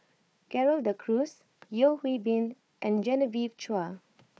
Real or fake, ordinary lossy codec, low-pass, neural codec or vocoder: fake; none; none; codec, 16 kHz, 4 kbps, FunCodec, trained on Chinese and English, 50 frames a second